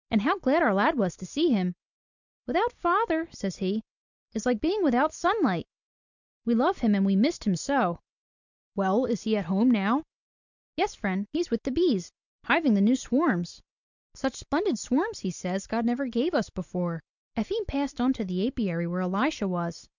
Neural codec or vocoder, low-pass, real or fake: none; 7.2 kHz; real